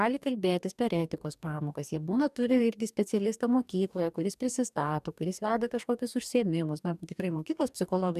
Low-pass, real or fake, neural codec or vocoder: 14.4 kHz; fake; codec, 44.1 kHz, 2.6 kbps, DAC